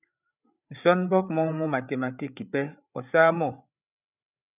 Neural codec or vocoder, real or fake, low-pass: codec, 16 kHz, 8 kbps, FreqCodec, larger model; fake; 3.6 kHz